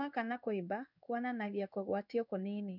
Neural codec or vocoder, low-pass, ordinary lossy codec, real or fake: codec, 16 kHz in and 24 kHz out, 1 kbps, XY-Tokenizer; 5.4 kHz; none; fake